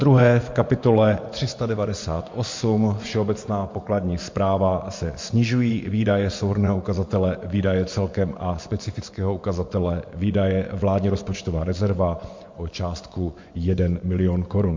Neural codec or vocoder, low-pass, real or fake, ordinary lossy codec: vocoder, 24 kHz, 100 mel bands, Vocos; 7.2 kHz; fake; MP3, 48 kbps